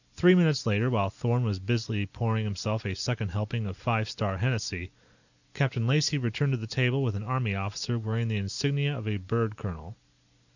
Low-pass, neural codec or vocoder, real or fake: 7.2 kHz; none; real